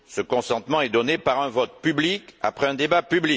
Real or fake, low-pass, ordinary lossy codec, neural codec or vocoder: real; none; none; none